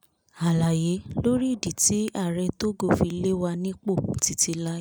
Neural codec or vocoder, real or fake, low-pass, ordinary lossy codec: none; real; none; none